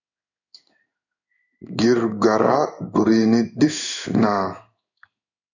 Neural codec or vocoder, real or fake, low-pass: codec, 16 kHz in and 24 kHz out, 1 kbps, XY-Tokenizer; fake; 7.2 kHz